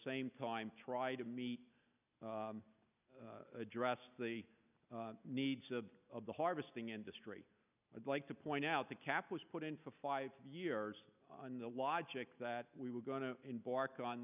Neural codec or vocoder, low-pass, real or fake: none; 3.6 kHz; real